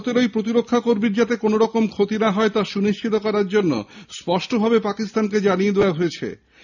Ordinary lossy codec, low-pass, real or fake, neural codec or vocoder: none; 7.2 kHz; real; none